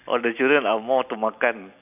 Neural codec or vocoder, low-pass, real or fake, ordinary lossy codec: none; 3.6 kHz; real; none